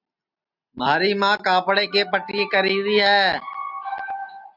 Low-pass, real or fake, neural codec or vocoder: 5.4 kHz; real; none